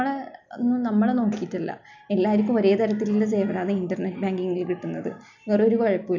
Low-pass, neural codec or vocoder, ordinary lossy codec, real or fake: 7.2 kHz; none; none; real